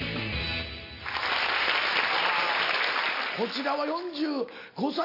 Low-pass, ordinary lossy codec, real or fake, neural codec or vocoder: 5.4 kHz; AAC, 32 kbps; real; none